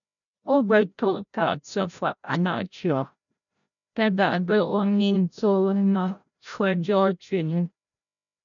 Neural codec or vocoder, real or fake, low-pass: codec, 16 kHz, 0.5 kbps, FreqCodec, larger model; fake; 7.2 kHz